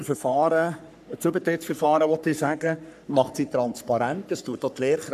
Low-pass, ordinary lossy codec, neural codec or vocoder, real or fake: 14.4 kHz; none; codec, 44.1 kHz, 3.4 kbps, Pupu-Codec; fake